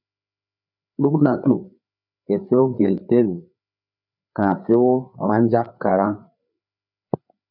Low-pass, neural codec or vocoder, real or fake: 5.4 kHz; codec, 16 kHz, 4 kbps, FreqCodec, larger model; fake